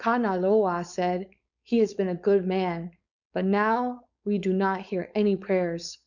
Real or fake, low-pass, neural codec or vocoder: fake; 7.2 kHz; codec, 16 kHz, 4.8 kbps, FACodec